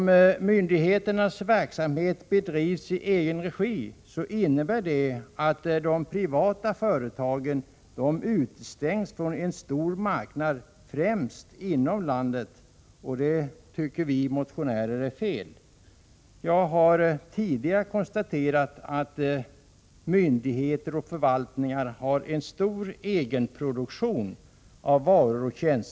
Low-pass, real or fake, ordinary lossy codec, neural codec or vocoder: none; real; none; none